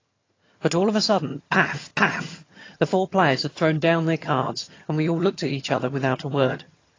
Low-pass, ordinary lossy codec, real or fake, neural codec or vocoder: 7.2 kHz; AAC, 32 kbps; fake; vocoder, 22.05 kHz, 80 mel bands, HiFi-GAN